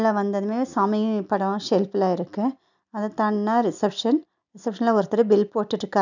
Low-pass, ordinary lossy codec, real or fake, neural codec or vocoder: 7.2 kHz; none; real; none